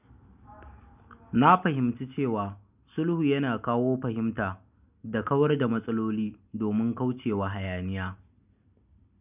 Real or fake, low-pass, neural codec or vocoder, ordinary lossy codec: real; 3.6 kHz; none; none